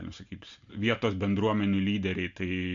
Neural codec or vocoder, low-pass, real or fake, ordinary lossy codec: none; 7.2 kHz; real; MP3, 64 kbps